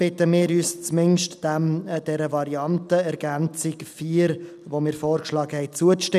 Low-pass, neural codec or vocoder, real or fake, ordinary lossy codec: 14.4 kHz; none; real; none